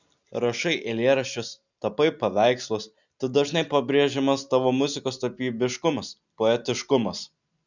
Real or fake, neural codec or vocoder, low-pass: real; none; 7.2 kHz